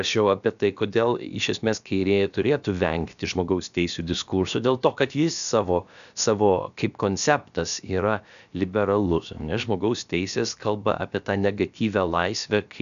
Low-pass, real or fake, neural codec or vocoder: 7.2 kHz; fake; codec, 16 kHz, about 1 kbps, DyCAST, with the encoder's durations